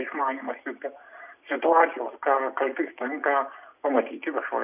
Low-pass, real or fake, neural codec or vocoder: 3.6 kHz; real; none